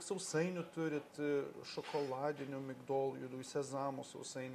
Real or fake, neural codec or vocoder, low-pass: real; none; 14.4 kHz